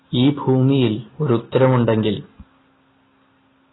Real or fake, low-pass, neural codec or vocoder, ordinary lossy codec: real; 7.2 kHz; none; AAC, 16 kbps